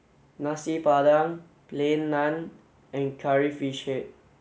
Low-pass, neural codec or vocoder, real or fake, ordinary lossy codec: none; none; real; none